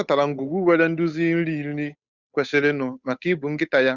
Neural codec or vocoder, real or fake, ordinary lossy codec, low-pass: codec, 16 kHz, 8 kbps, FunCodec, trained on Chinese and English, 25 frames a second; fake; Opus, 64 kbps; 7.2 kHz